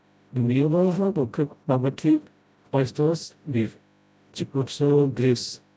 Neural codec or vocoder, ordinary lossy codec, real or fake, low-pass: codec, 16 kHz, 0.5 kbps, FreqCodec, smaller model; none; fake; none